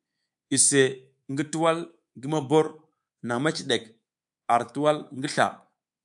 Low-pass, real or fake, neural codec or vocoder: 10.8 kHz; fake; codec, 24 kHz, 3.1 kbps, DualCodec